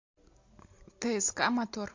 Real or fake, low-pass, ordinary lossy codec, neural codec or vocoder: real; 7.2 kHz; MP3, 48 kbps; none